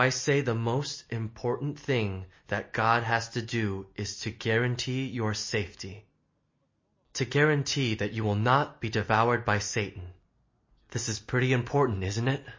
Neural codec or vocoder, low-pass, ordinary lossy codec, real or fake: none; 7.2 kHz; MP3, 32 kbps; real